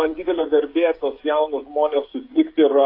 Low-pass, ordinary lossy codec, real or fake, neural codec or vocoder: 5.4 kHz; AAC, 32 kbps; fake; codec, 16 kHz, 16 kbps, FreqCodec, smaller model